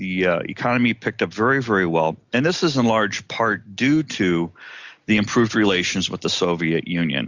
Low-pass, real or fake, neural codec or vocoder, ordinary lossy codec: 7.2 kHz; real; none; Opus, 64 kbps